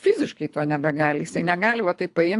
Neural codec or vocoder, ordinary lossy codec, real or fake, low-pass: codec, 24 kHz, 3 kbps, HILCodec; AAC, 64 kbps; fake; 10.8 kHz